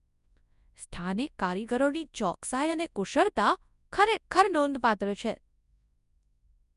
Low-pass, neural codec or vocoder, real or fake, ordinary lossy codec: 10.8 kHz; codec, 24 kHz, 0.9 kbps, WavTokenizer, large speech release; fake; none